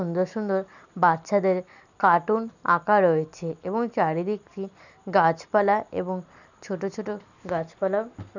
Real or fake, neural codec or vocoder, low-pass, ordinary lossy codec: real; none; 7.2 kHz; none